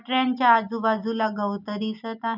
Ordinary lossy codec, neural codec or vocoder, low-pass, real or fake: none; none; 5.4 kHz; real